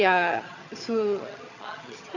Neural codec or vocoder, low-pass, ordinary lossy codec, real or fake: vocoder, 22.05 kHz, 80 mel bands, HiFi-GAN; 7.2 kHz; MP3, 48 kbps; fake